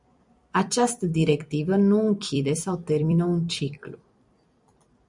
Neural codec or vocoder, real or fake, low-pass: vocoder, 44.1 kHz, 128 mel bands every 256 samples, BigVGAN v2; fake; 10.8 kHz